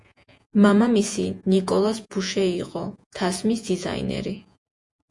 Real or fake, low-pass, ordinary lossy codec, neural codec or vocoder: fake; 10.8 kHz; MP3, 64 kbps; vocoder, 48 kHz, 128 mel bands, Vocos